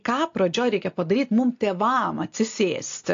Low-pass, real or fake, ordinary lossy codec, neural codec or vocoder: 7.2 kHz; real; AAC, 48 kbps; none